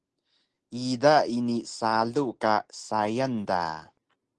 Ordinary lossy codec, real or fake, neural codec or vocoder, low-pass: Opus, 16 kbps; real; none; 10.8 kHz